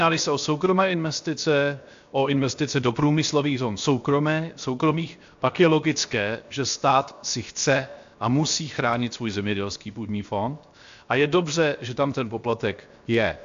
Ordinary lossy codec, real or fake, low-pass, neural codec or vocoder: AAC, 64 kbps; fake; 7.2 kHz; codec, 16 kHz, 0.7 kbps, FocalCodec